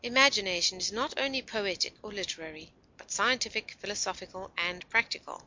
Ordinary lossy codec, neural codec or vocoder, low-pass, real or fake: MP3, 48 kbps; none; 7.2 kHz; real